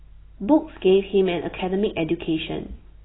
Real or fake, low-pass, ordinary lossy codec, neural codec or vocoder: real; 7.2 kHz; AAC, 16 kbps; none